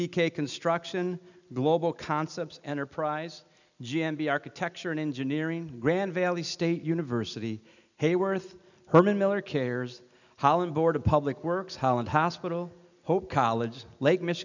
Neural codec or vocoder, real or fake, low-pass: none; real; 7.2 kHz